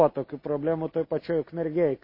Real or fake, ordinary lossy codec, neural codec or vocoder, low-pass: real; MP3, 24 kbps; none; 5.4 kHz